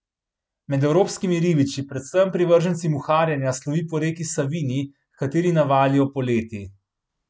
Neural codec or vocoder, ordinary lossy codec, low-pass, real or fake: none; none; none; real